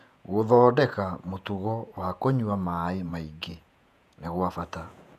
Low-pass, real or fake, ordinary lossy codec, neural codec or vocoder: 14.4 kHz; fake; none; vocoder, 48 kHz, 128 mel bands, Vocos